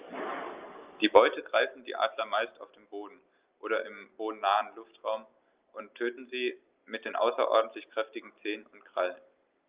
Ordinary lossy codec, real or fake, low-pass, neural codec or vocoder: Opus, 24 kbps; real; 3.6 kHz; none